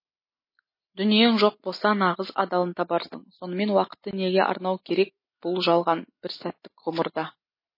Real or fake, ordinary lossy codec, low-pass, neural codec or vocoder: real; MP3, 24 kbps; 5.4 kHz; none